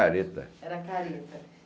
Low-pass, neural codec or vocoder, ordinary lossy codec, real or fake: none; none; none; real